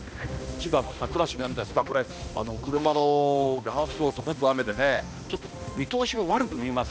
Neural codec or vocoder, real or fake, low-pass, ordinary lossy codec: codec, 16 kHz, 1 kbps, X-Codec, HuBERT features, trained on balanced general audio; fake; none; none